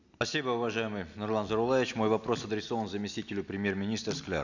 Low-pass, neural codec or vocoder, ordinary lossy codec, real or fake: 7.2 kHz; none; none; real